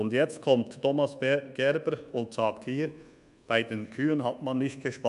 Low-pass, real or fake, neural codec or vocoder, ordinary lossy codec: 10.8 kHz; fake; codec, 24 kHz, 1.2 kbps, DualCodec; none